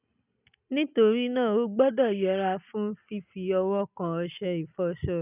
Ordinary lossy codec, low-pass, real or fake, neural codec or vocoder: none; 3.6 kHz; real; none